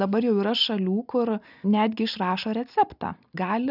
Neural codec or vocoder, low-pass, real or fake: none; 5.4 kHz; real